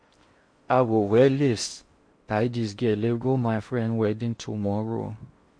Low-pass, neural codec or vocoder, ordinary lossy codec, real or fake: 9.9 kHz; codec, 16 kHz in and 24 kHz out, 0.6 kbps, FocalCodec, streaming, 4096 codes; MP3, 48 kbps; fake